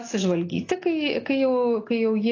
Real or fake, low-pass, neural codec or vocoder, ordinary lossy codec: real; 7.2 kHz; none; AAC, 32 kbps